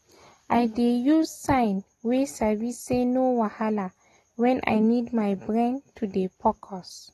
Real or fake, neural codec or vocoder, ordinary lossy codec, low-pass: real; none; AAC, 32 kbps; 19.8 kHz